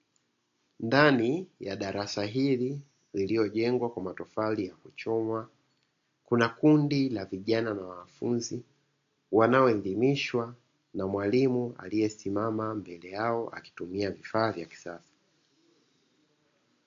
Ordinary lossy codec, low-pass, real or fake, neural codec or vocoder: AAC, 48 kbps; 7.2 kHz; real; none